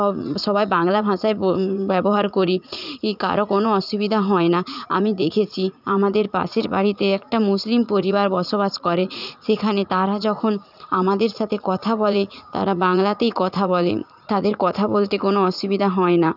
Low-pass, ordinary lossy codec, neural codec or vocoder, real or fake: 5.4 kHz; none; vocoder, 44.1 kHz, 80 mel bands, Vocos; fake